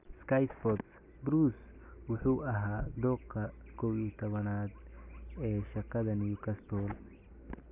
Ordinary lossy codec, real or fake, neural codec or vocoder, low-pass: none; real; none; 3.6 kHz